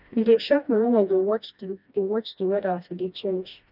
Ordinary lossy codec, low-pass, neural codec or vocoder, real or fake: none; 5.4 kHz; codec, 16 kHz, 1 kbps, FreqCodec, smaller model; fake